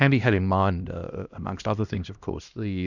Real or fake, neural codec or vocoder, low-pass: fake; codec, 16 kHz, 1 kbps, X-Codec, HuBERT features, trained on LibriSpeech; 7.2 kHz